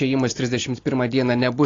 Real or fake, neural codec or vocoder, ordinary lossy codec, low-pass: real; none; AAC, 32 kbps; 7.2 kHz